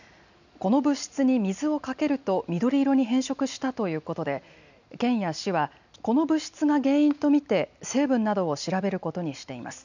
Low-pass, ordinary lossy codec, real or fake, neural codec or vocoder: 7.2 kHz; none; real; none